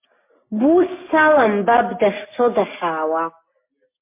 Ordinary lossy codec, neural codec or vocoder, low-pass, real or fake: MP3, 24 kbps; none; 3.6 kHz; real